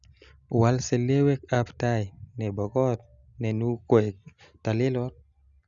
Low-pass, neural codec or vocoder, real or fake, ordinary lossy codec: 7.2 kHz; none; real; Opus, 64 kbps